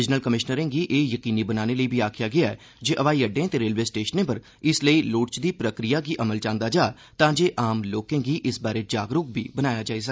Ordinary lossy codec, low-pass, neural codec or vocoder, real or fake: none; none; none; real